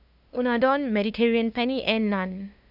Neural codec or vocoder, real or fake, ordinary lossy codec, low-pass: codec, 16 kHz, 2 kbps, FunCodec, trained on LibriTTS, 25 frames a second; fake; none; 5.4 kHz